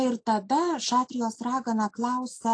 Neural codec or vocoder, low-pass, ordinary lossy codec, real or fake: none; 9.9 kHz; AAC, 48 kbps; real